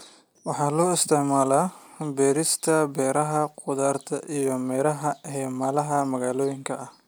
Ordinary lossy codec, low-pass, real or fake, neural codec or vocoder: none; none; real; none